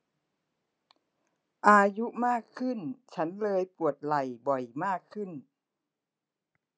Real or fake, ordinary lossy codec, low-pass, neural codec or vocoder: real; none; none; none